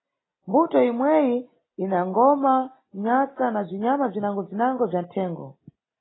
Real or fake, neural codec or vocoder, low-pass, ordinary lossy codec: real; none; 7.2 kHz; AAC, 16 kbps